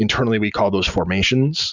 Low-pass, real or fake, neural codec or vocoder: 7.2 kHz; real; none